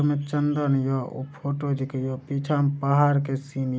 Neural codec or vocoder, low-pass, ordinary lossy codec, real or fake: none; none; none; real